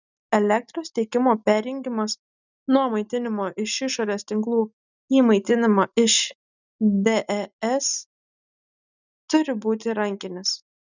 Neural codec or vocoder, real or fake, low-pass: none; real; 7.2 kHz